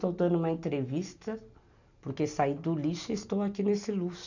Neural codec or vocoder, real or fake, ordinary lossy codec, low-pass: none; real; none; 7.2 kHz